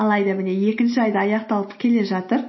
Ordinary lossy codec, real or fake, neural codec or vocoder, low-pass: MP3, 24 kbps; real; none; 7.2 kHz